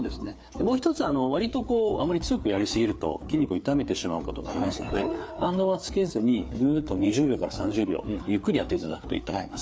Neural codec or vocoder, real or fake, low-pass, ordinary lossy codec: codec, 16 kHz, 4 kbps, FreqCodec, larger model; fake; none; none